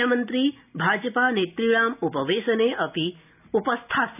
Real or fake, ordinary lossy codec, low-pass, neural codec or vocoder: real; none; 3.6 kHz; none